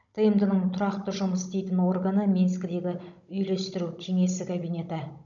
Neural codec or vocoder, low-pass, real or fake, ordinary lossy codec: codec, 16 kHz, 16 kbps, FunCodec, trained on Chinese and English, 50 frames a second; 7.2 kHz; fake; none